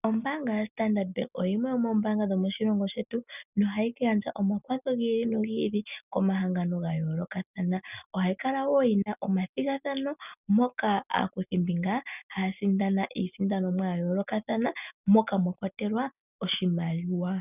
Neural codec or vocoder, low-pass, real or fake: none; 3.6 kHz; real